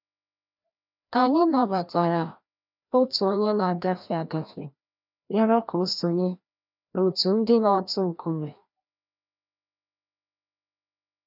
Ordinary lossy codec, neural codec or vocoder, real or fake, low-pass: none; codec, 16 kHz, 1 kbps, FreqCodec, larger model; fake; 5.4 kHz